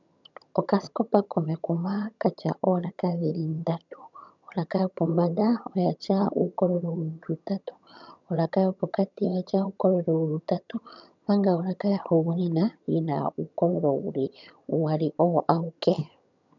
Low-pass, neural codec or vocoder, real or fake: 7.2 kHz; vocoder, 22.05 kHz, 80 mel bands, HiFi-GAN; fake